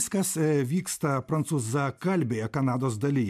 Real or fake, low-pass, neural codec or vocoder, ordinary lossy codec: real; 14.4 kHz; none; MP3, 96 kbps